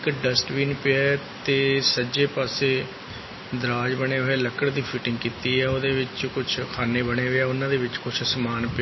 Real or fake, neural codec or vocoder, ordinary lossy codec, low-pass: real; none; MP3, 24 kbps; 7.2 kHz